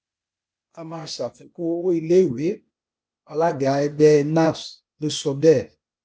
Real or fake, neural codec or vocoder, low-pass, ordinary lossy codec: fake; codec, 16 kHz, 0.8 kbps, ZipCodec; none; none